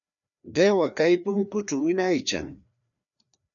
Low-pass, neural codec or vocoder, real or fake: 7.2 kHz; codec, 16 kHz, 2 kbps, FreqCodec, larger model; fake